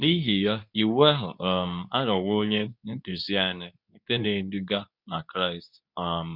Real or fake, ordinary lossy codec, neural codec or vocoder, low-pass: fake; none; codec, 24 kHz, 0.9 kbps, WavTokenizer, medium speech release version 2; 5.4 kHz